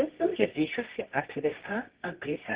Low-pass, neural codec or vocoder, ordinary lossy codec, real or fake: 3.6 kHz; codec, 24 kHz, 0.9 kbps, WavTokenizer, medium music audio release; Opus, 16 kbps; fake